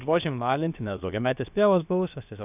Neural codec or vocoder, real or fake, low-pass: codec, 16 kHz, about 1 kbps, DyCAST, with the encoder's durations; fake; 3.6 kHz